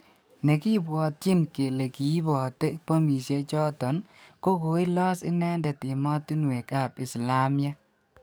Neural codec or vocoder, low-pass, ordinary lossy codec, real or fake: codec, 44.1 kHz, 7.8 kbps, DAC; none; none; fake